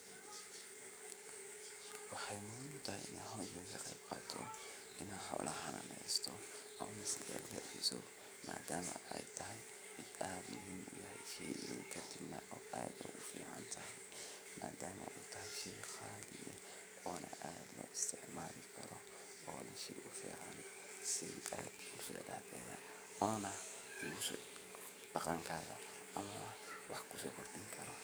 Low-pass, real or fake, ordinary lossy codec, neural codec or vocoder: none; fake; none; codec, 44.1 kHz, 7.8 kbps, DAC